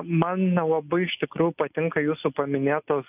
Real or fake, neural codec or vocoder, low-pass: real; none; 3.6 kHz